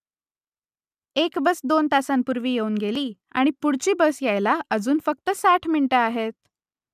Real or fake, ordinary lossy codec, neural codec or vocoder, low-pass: real; none; none; 14.4 kHz